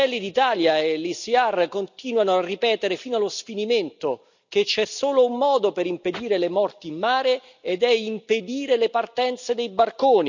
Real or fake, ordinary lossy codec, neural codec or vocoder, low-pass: real; none; none; 7.2 kHz